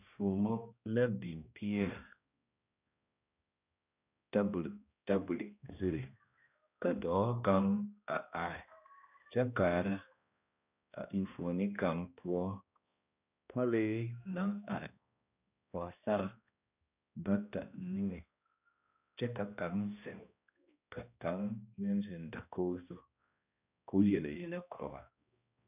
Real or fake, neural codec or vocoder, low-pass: fake; codec, 16 kHz, 1 kbps, X-Codec, HuBERT features, trained on balanced general audio; 3.6 kHz